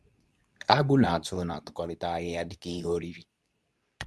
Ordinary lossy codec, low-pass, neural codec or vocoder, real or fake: none; none; codec, 24 kHz, 0.9 kbps, WavTokenizer, medium speech release version 2; fake